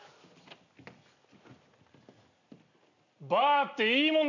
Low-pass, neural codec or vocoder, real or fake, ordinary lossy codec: 7.2 kHz; none; real; none